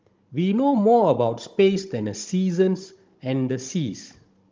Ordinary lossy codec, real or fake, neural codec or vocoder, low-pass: Opus, 32 kbps; fake; codec, 16 kHz, 16 kbps, FunCodec, trained on Chinese and English, 50 frames a second; 7.2 kHz